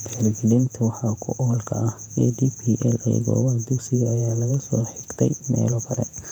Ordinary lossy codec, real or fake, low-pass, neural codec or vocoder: none; fake; 19.8 kHz; vocoder, 48 kHz, 128 mel bands, Vocos